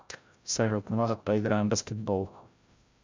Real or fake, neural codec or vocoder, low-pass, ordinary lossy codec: fake; codec, 16 kHz, 0.5 kbps, FreqCodec, larger model; 7.2 kHz; MP3, 64 kbps